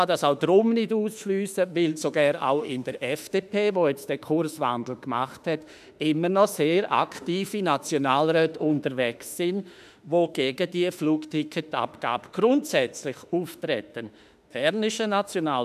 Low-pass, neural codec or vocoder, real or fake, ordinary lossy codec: 14.4 kHz; autoencoder, 48 kHz, 32 numbers a frame, DAC-VAE, trained on Japanese speech; fake; none